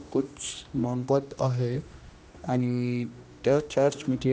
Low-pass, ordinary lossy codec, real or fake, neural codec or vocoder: none; none; fake; codec, 16 kHz, 1 kbps, X-Codec, HuBERT features, trained on general audio